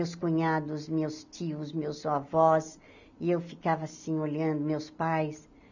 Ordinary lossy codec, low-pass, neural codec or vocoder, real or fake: none; 7.2 kHz; none; real